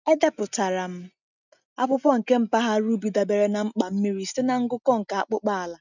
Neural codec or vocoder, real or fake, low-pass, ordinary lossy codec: none; real; 7.2 kHz; none